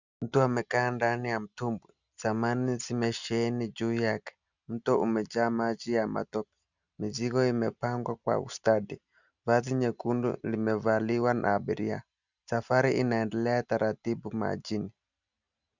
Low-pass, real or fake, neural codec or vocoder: 7.2 kHz; real; none